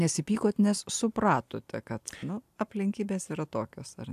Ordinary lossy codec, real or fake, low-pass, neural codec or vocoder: AAC, 96 kbps; real; 14.4 kHz; none